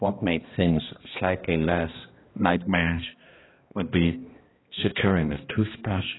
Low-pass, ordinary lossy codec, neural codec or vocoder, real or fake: 7.2 kHz; AAC, 16 kbps; codec, 16 kHz, 1 kbps, X-Codec, HuBERT features, trained on balanced general audio; fake